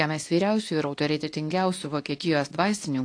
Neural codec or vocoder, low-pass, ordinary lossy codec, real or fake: codec, 24 kHz, 0.9 kbps, WavTokenizer, medium speech release version 2; 9.9 kHz; AAC, 48 kbps; fake